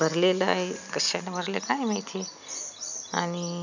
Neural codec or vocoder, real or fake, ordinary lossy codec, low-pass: none; real; none; 7.2 kHz